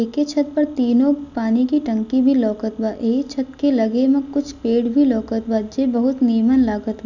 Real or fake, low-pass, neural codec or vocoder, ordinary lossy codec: real; 7.2 kHz; none; none